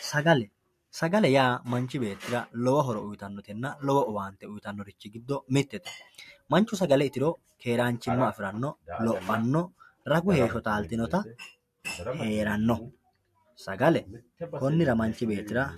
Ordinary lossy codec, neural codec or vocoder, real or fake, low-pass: MP3, 64 kbps; none; real; 14.4 kHz